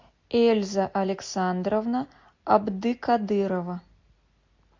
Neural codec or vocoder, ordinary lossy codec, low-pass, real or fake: none; MP3, 48 kbps; 7.2 kHz; real